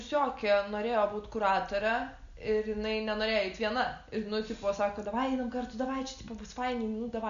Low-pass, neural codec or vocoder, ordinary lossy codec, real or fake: 7.2 kHz; none; MP3, 96 kbps; real